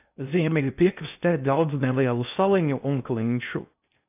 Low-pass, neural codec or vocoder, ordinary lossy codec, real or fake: 3.6 kHz; codec, 16 kHz in and 24 kHz out, 0.6 kbps, FocalCodec, streaming, 2048 codes; AAC, 32 kbps; fake